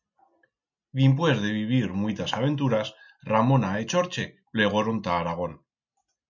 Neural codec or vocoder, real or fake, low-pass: none; real; 7.2 kHz